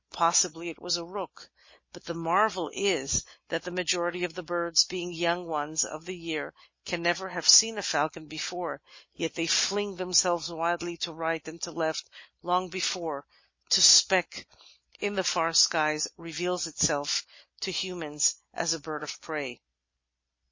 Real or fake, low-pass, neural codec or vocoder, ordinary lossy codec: real; 7.2 kHz; none; MP3, 32 kbps